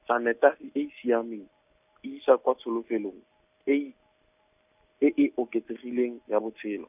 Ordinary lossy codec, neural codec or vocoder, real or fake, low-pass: none; none; real; 3.6 kHz